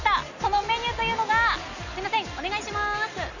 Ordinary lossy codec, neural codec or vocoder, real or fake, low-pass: none; none; real; 7.2 kHz